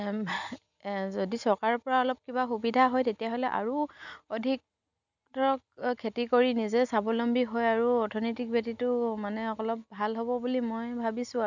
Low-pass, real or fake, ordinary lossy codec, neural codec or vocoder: 7.2 kHz; real; none; none